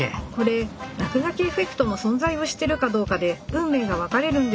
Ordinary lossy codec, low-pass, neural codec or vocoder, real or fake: none; none; none; real